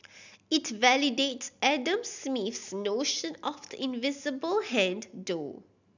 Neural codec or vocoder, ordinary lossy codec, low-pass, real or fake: none; none; 7.2 kHz; real